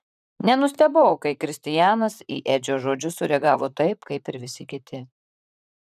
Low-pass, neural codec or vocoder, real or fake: 14.4 kHz; vocoder, 44.1 kHz, 128 mel bands, Pupu-Vocoder; fake